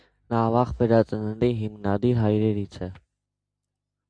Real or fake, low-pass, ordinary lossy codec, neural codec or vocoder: real; 9.9 kHz; AAC, 64 kbps; none